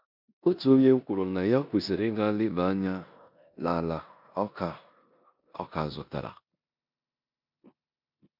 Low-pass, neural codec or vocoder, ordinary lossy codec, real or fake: 5.4 kHz; codec, 16 kHz in and 24 kHz out, 0.9 kbps, LongCat-Audio-Codec, four codebook decoder; MP3, 48 kbps; fake